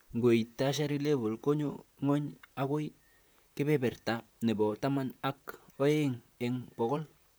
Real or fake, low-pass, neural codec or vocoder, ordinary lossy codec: fake; none; vocoder, 44.1 kHz, 128 mel bands, Pupu-Vocoder; none